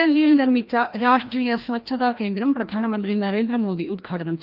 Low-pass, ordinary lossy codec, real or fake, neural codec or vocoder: 5.4 kHz; Opus, 32 kbps; fake; codec, 16 kHz, 1 kbps, FreqCodec, larger model